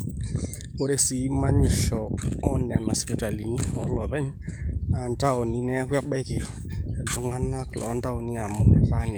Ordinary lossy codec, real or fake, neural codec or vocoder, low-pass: none; fake; codec, 44.1 kHz, 7.8 kbps, DAC; none